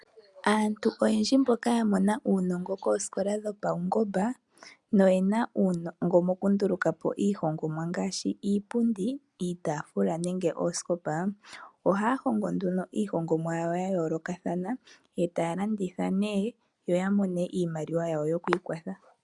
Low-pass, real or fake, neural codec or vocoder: 10.8 kHz; real; none